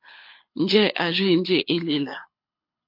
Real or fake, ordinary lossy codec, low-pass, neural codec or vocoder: fake; MP3, 32 kbps; 5.4 kHz; codec, 24 kHz, 6 kbps, HILCodec